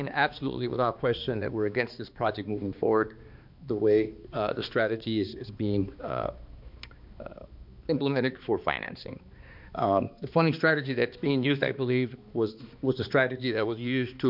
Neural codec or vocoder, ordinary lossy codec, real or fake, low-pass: codec, 16 kHz, 2 kbps, X-Codec, HuBERT features, trained on balanced general audio; MP3, 48 kbps; fake; 5.4 kHz